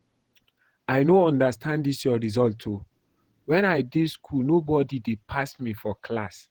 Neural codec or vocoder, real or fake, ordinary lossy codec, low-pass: vocoder, 48 kHz, 128 mel bands, Vocos; fake; Opus, 16 kbps; 19.8 kHz